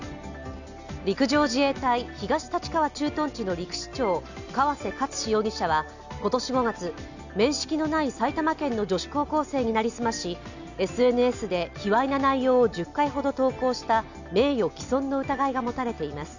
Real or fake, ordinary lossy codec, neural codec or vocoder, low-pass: real; none; none; 7.2 kHz